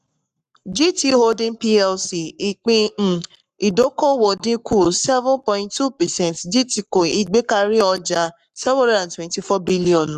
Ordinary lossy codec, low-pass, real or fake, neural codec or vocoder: none; 14.4 kHz; fake; codec, 44.1 kHz, 7.8 kbps, Pupu-Codec